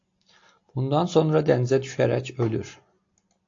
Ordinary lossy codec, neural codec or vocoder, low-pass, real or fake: AAC, 48 kbps; none; 7.2 kHz; real